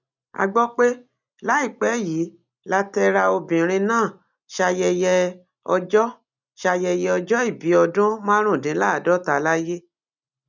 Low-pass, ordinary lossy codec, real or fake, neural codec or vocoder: 7.2 kHz; none; real; none